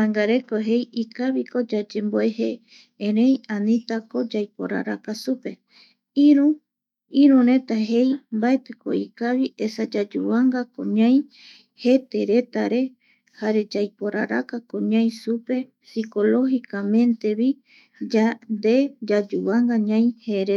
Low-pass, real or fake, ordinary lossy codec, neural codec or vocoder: 19.8 kHz; fake; none; autoencoder, 48 kHz, 128 numbers a frame, DAC-VAE, trained on Japanese speech